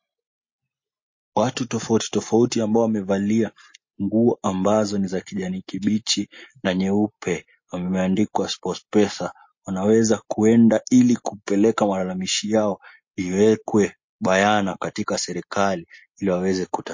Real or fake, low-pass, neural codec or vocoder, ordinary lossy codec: real; 7.2 kHz; none; MP3, 32 kbps